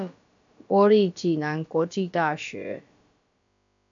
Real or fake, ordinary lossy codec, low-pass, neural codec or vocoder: fake; AAC, 64 kbps; 7.2 kHz; codec, 16 kHz, about 1 kbps, DyCAST, with the encoder's durations